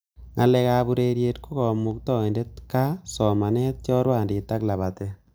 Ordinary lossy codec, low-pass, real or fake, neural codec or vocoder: none; none; real; none